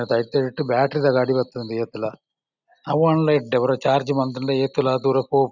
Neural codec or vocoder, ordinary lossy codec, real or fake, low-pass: none; none; real; 7.2 kHz